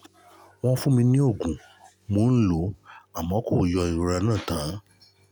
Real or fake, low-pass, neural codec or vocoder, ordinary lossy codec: real; none; none; none